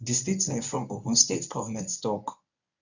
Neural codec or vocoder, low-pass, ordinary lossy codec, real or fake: codec, 24 kHz, 0.9 kbps, WavTokenizer, medium speech release version 1; 7.2 kHz; none; fake